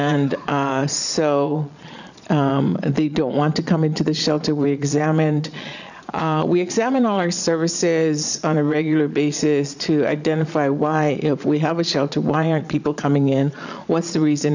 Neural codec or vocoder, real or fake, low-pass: vocoder, 22.05 kHz, 80 mel bands, Vocos; fake; 7.2 kHz